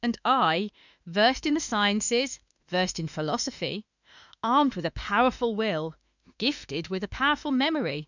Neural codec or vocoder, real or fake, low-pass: autoencoder, 48 kHz, 32 numbers a frame, DAC-VAE, trained on Japanese speech; fake; 7.2 kHz